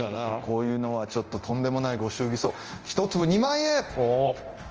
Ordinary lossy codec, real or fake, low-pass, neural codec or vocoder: Opus, 24 kbps; fake; 7.2 kHz; codec, 24 kHz, 0.9 kbps, DualCodec